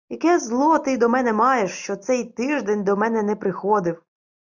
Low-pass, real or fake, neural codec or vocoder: 7.2 kHz; real; none